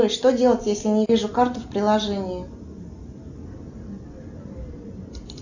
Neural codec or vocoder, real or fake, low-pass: none; real; 7.2 kHz